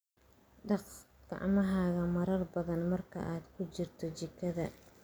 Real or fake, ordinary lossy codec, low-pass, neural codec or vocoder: real; none; none; none